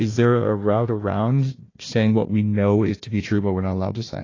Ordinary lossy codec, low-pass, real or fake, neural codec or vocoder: AAC, 32 kbps; 7.2 kHz; fake; codec, 16 kHz, 1 kbps, FunCodec, trained on Chinese and English, 50 frames a second